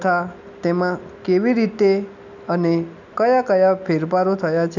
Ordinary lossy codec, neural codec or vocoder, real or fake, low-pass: none; none; real; 7.2 kHz